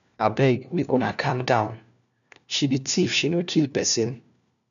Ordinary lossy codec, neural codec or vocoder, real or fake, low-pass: none; codec, 16 kHz, 1 kbps, FunCodec, trained on LibriTTS, 50 frames a second; fake; 7.2 kHz